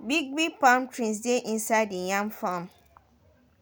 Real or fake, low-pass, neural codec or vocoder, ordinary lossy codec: real; none; none; none